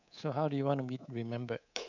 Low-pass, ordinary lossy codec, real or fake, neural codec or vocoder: 7.2 kHz; none; fake; codec, 24 kHz, 3.1 kbps, DualCodec